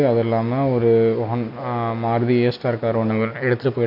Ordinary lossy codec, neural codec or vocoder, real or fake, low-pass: none; none; real; 5.4 kHz